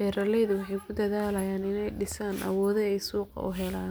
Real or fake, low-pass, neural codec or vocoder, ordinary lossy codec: real; none; none; none